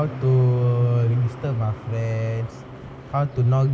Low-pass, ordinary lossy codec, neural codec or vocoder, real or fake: none; none; none; real